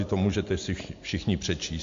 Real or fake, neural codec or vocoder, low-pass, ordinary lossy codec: real; none; 7.2 kHz; AAC, 48 kbps